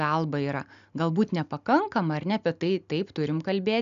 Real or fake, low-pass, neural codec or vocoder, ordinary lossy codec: real; 7.2 kHz; none; AAC, 96 kbps